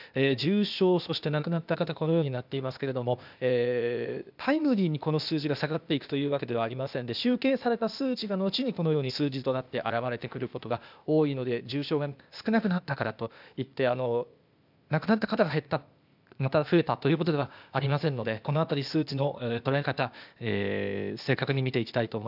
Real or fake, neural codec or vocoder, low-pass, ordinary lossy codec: fake; codec, 16 kHz, 0.8 kbps, ZipCodec; 5.4 kHz; none